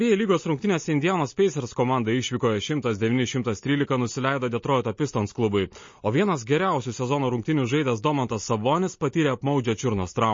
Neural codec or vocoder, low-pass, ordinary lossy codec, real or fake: none; 7.2 kHz; MP3, 32 kbps; real